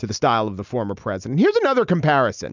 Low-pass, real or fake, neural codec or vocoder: 7.2 kHz; real; none